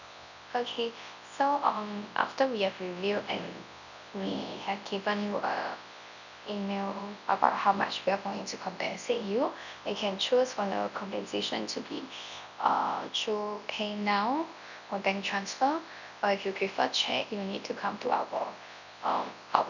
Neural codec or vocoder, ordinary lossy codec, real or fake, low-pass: codec, 24 kHz, 0.9 kbps, WavTokenizer, large speech release; none; fake; 7.2 kHz